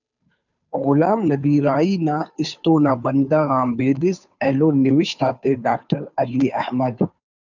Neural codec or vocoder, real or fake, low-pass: codec, 16 kHz, 2 kbps, FunCodec, trained on Chinese and English, 25 frames a second; fake; 7.2 kHz